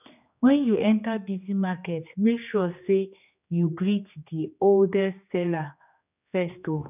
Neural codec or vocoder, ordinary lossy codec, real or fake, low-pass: codec, 16 kHz, 2 kbps, X-Codec, HuBERT features, trained on general audio; none; fake; 3.6 kHz